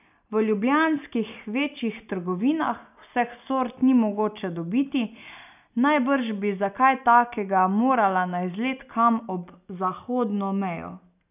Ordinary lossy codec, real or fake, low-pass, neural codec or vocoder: none; real; 3.6 kHz; none